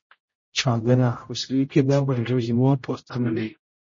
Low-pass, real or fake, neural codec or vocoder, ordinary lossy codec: 7.2 kHz; fake; codec, 16 kHz, 0.5 kbps, X-Codec, HuBERT features, trained on general audio; MP3, 32 kbps